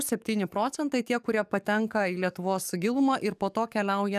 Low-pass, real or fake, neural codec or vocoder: 14.4 kHz; fake; codec, 44.1 kHz, 7.8 kbps, DAC